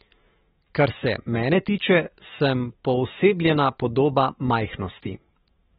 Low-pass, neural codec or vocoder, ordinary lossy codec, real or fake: 19.8 kHz; autoencoder, 48 kHz, 128 numbers a frame, DAC-VAE, trained on Japanese speech; AAC, 16 kbps; fake